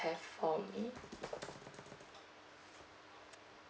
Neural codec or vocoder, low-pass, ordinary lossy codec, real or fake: none; none; none; real